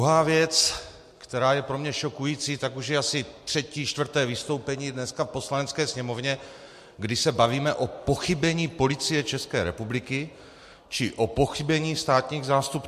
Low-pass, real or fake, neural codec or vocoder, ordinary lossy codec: 14.4 kHz; real; none; MP3, 64 kbps